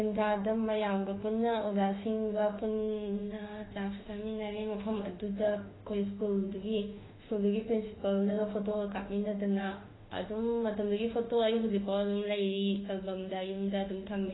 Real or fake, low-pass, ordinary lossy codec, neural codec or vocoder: fake; 7.2 kHz; AAC, 16 kbps; autoencoder, 48 kHz, 32 numbers a frame, DAC-VAE, trained on Japanese speech